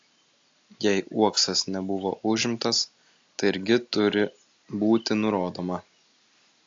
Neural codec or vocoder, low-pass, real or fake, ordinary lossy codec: none; 7.2 kHz; real; AAC, 48 kbps